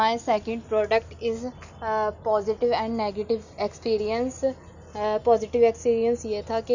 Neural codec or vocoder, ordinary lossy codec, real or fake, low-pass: none; none; real; 7.2 kHz